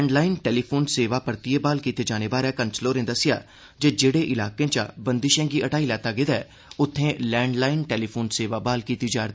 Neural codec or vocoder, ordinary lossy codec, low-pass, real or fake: none; none; none; real